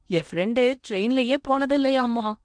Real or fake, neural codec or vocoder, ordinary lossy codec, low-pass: fake; codec, 16 kHz in and 24 kHz out, 0.8 kbps, FocalCodec, streaming, 65536 codes; none; 9.9 kHz